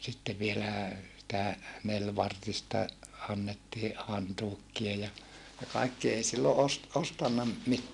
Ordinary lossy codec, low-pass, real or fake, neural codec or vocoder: none; 10.8 kHz; real; none